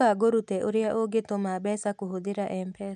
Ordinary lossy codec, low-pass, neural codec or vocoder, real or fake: none; none; none; real